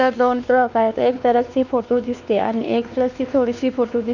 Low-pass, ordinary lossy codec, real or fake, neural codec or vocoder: 7.2 kHz; none; fake; codec, 16 kHz, 2 kbps, X-Codec, WavLM features, trained on Multilingual LibriSpeech